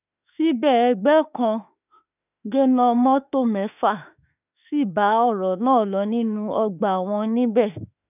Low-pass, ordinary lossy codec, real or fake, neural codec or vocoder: 3.6 kHz; none; fake; autoencoder, 48 kHz, 32 numbers a frame, DAC-VAE, trained on Japanese speech